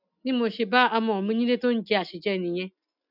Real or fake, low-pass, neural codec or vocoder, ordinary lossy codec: real; 5.4 kHz; none; none